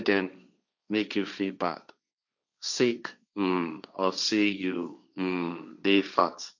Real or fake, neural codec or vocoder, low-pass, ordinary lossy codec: fake; codec, 16 kHz, 1.1 kbps, Voila-Tokenizer; 7.2 kHz; none